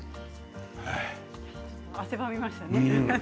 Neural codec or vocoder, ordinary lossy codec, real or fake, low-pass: none; none; real; none